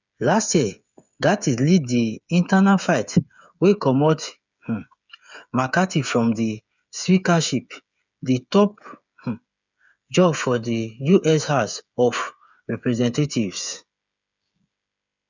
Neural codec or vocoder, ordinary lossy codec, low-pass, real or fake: codec, 16 kHz, 8 kbps, FreqCodec, smaller model; none; 7.2 kHz; fake